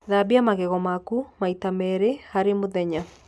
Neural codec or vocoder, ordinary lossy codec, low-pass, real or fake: none; none; none; real